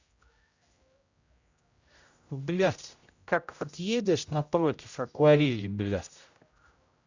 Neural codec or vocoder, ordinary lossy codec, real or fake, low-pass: codec, 16 kHz, 0.5 kbps, X-Codec, HuBERT features, trained on general audio; Opus, 64 kbps; fake; 7.2 kHz